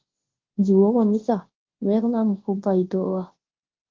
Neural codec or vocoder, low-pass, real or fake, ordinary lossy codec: codec, 24 kHz, 0.9 kbps, WavTokenizer, large speech release; 7.2 kHz; fake; Opus, 16 kbps